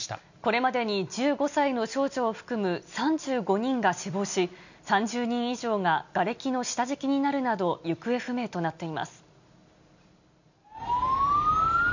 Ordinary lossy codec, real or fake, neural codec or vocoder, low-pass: none; real; none; 7.2 kHz